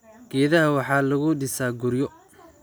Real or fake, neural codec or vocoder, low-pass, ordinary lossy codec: real; none; none; none